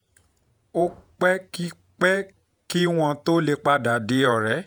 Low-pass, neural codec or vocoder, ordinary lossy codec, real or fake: none; vocoder, 48 kHz, 128 mel bands, Vocos; none; fake